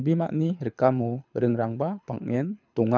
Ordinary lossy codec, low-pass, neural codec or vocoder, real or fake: none; 7.2 kHz; codec, 24 kHz, 6 kbps, HILCodec; fake